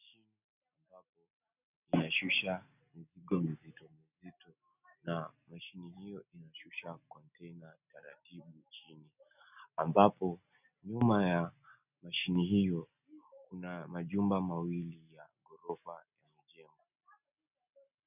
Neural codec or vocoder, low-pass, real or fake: none; 3.6 kHz; real